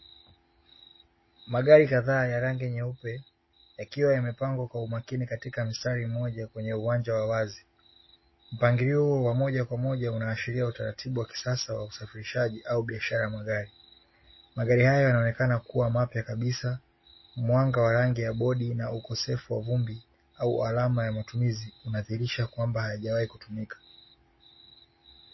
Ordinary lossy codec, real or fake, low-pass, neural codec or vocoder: MP3, 24 kbps; real; 7.2 kHz; none